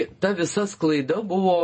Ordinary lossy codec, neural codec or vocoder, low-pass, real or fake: MP3, 32 kbps; vocoder, 48 kHz, 128 mel bands, Vocos; 9.9 kHz; fake